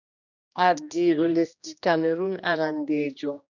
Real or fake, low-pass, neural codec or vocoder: fake; 7.2 kHz; codec, 16 kHz, 1 kbps, X-Codec, HuBERT features, trained on general audio